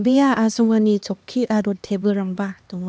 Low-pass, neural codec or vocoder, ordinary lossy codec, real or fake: none; codec, 16 kHz, 2 kbps, X-Codec, HuBERT features, trained on LibriSpeech; none; fake